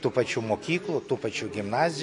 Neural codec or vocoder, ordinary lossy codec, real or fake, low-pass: none; MP3, 48 kbps; real; 10.8 kHz